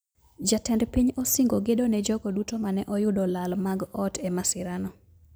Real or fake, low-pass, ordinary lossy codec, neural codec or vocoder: real; none; none; none